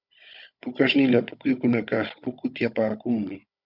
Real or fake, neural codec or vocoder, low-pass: fake; codec, 16 kHz, 16 kbps, FunCodec, trained on Chinese and English, 50 frames a second; 5.4 kHz